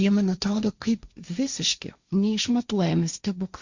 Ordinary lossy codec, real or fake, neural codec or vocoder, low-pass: Opus, 64 kbps; fake; codec, 16 kHz, 1.1 kbps, Voila-Tokenizer; 7.2 kHz